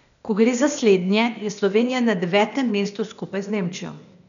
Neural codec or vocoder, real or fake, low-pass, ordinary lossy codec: codec, 16 kHz, 0.8 kbps, ZipCodec; fake; 7.2 kHz; none